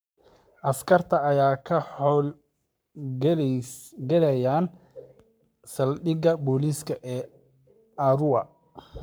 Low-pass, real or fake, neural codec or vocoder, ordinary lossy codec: none; fake; codec, 44.1 kHz, 7.8 kbps, Pupu-Codec; none